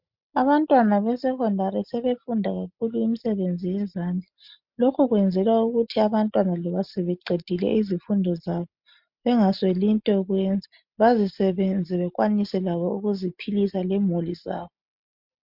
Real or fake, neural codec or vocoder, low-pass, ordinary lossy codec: real; none; 5.4 kHz; MP3, 48 kbps